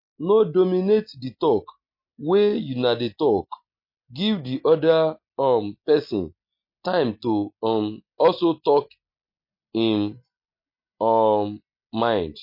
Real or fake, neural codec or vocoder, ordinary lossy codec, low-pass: real; none; MP3, 32 kbps; 5.4 kHz